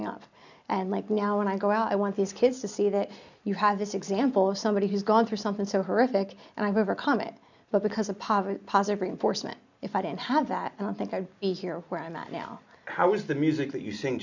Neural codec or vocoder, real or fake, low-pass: none; real; 7.2 kHz